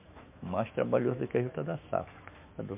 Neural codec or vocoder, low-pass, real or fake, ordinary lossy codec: autoencoder, 48 kHz, 128 numbers a frame, DAC-VAE, trained on Japanese speech; 3.6 kHz; fake; MP3, 32 kbps